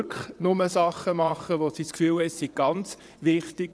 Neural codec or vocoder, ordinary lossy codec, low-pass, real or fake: vocoder, 22.05 kHz, 80 mel bands, WaveNeXt; none; none; fake